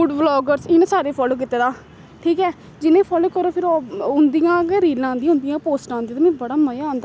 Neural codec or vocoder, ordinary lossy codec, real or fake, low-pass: none; none; real; none